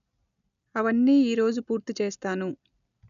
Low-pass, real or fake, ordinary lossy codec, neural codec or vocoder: 7.2 kHz; real; none; none